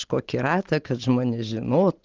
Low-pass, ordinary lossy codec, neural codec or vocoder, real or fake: 7.2 kHz; Opus, 16 kbps; none; real